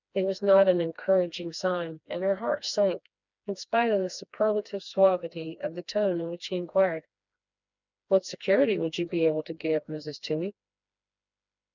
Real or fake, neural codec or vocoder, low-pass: fake; codec, 16 kHz, 2 kbps, FreqCodec, smaller model; 7.2 kHz